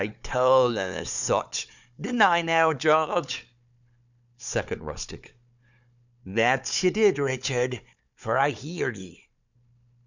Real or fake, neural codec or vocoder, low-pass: fake; codec, 16 kHz, 4 kbps, FunCodec, trained on Chinese and English, 50 frames a second; 7.2 kHz